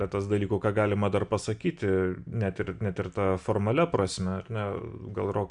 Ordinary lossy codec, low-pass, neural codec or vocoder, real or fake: MP3, 96 kbps; 9.9 kHz; none; real